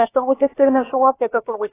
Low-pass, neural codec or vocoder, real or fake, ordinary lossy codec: 3.6 kHz; codec, 16 kHz, about 1 kbps, DyCAST, with the encoder's durations; fake; AAC, 16 kbps